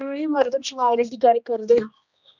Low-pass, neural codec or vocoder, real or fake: 7.2 kHz; codec, 16 kHz, 1 kbps, X-Codec, HuBERT features, trained on general audio; fake